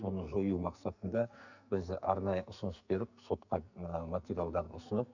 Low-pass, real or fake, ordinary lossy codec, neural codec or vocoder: 7.2 kHz; fake; none; codec, 44.1 kHz, 2.6 kbps, SNAC